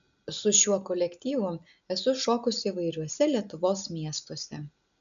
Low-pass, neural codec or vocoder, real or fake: 7.2 kHz; none; real